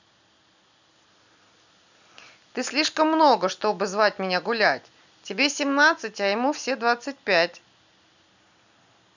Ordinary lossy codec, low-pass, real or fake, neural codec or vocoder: none; 7.2 kHz; real; none